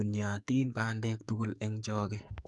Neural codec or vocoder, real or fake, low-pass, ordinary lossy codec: codec, 44.1 kHz, 2.6 kbps, SNAC; fake; 10.8 kHz; none